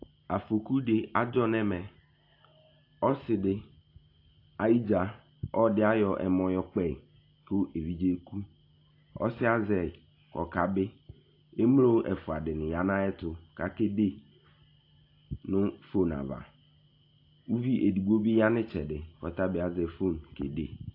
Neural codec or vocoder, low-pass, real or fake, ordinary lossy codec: none; 5.4 kHz; real; AAC, 32 kbps